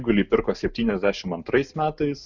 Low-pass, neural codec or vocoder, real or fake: 7.2 kHz; none; real